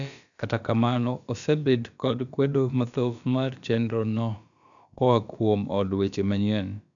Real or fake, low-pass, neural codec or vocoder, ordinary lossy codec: fake; 7.2 kHz; codec, 16 kHz, about 1 kbps, DyCAST, with the encoder's durations; none